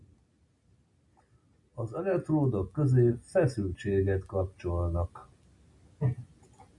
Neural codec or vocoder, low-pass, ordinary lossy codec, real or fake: none; 10.8 kHz; MP3, 96 kbps; real